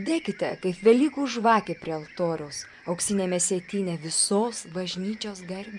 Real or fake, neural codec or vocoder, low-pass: fake; vocoder, 24 kHz, 100 mel bands, Vocos; 10.8 kHz